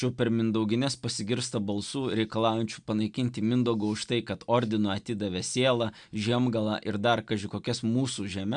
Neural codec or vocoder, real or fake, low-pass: none; real; 9.9 kHz